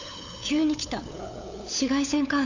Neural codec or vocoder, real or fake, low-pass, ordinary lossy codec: codec, 16 kHz, 8 kbps, FunCodec, trained on LibriTTS, 25 frames a second; fake; 7.2 kHz; AAC, 48 kbps